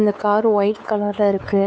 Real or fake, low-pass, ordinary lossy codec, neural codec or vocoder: fake; none; none; codec, 16 kHz, 4 kbps, X-Codec, WavLM features, trained on Multilingual LibriSpeech